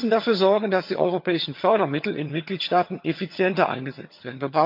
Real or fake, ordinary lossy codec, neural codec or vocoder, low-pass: fake; MP3, 48 kbps; vocoder, 22.05 kHz, 80 mel bands, HiFi-GAN; 5.4 kHz